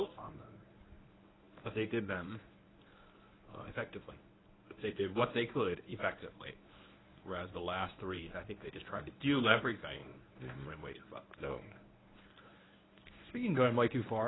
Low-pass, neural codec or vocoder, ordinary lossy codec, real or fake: 7.2 kHz; codec, 24 kHz, 0.9 kbps, WavTokenizer, medium speech release version 1; AAC, 16 kbps; fake